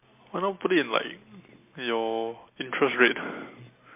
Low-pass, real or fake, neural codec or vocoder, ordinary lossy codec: 3.6 kHz; real; none; MP3, 24 kbps